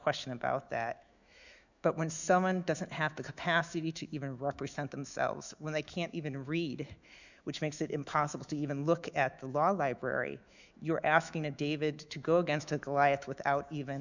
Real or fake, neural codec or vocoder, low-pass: fake; autoencoder, 48 kHz, 128 numbers a frame, DAC-VAE, trained on Japanese speech; 7.2 kHz